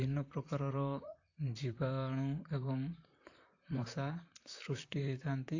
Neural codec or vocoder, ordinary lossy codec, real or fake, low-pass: vocoder, 44.1 kHz, 128 mel bands, Pupu-Vocoder; AAC, 48 kbps; fake; 7.2 kHz